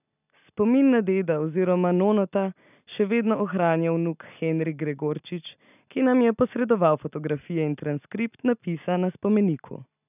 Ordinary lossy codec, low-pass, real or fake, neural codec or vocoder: none; 3.6 kHz; real; none